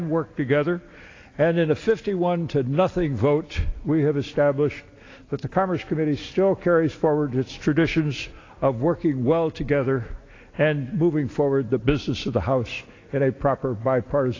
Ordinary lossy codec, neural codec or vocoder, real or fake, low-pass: AAC, 32 kbps; none; real; 7.2 kHz